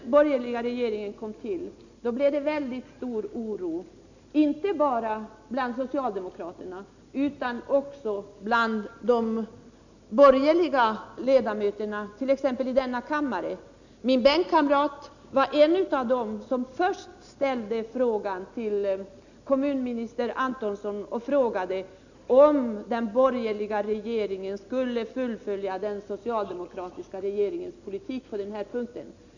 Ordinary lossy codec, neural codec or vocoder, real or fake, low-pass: none; none; real; 7.2 kHz